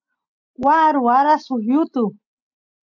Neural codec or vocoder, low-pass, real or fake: none; 7.2 kHz; real